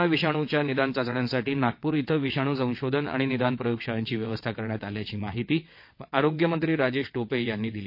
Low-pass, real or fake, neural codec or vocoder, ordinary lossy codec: 5.4 kHz; fake; vocoder, 22.05 kHz, 80 mel bands, WaveNeXt; MP3, 32 kbps